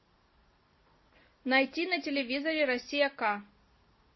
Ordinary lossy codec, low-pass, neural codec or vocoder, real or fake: MP3, 24 kbps; 7.2 kHz; none; real